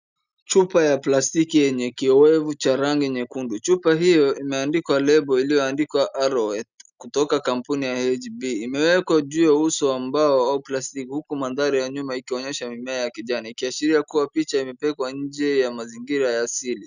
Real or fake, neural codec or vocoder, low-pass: real; none; 7.2 kHz